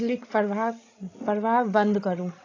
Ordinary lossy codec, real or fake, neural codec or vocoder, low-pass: AAC, 32 kbps; fake; codec, 16 kHz, 16 kbps, FreqCodec, larger model; 7.2 kHz